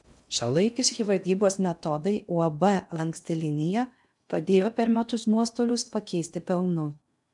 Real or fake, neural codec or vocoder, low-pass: fake; codec, 16 kHz in and 24 kHz out, 0.6 kbps, FocalCodec, streaming, 2048 codes; 10.8 kHz